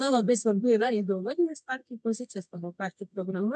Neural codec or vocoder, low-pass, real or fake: codec, 24 kHz, 0.9 kbps, WavTokenizer, medium music audio release; 10.8 kHz; fake